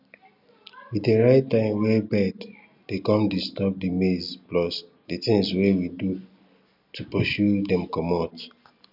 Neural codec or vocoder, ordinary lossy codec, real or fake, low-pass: none; none; real; 5.4 kHz